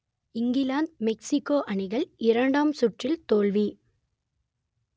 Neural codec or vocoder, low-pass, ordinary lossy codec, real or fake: none; none; none; real